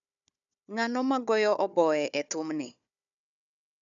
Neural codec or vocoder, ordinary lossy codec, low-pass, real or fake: codec, 16 kHz, 4 kbps, FunCodec, trained on Chinese and English, 50 frames a second; none; 7.2 kHz; fake